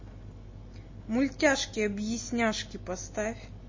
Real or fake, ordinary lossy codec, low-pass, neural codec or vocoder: real; MP3, 32 kbps; 7.2 kHz; none